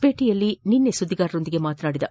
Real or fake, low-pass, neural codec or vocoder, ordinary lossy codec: real; none; none; none